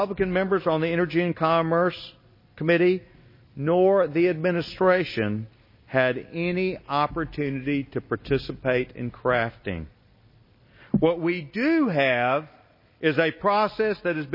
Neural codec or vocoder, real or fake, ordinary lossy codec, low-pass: none; real; MP3, 24 kbps; 5.4 kHz